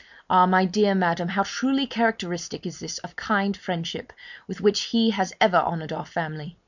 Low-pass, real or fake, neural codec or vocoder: 7.2 kHz; real; none